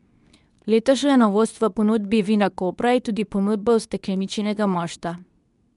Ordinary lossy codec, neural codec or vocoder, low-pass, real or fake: none; codec, 24 kHz, 0.9 kbps, WavTokenizer, medium speech release version 2; 10.8 kHz; fake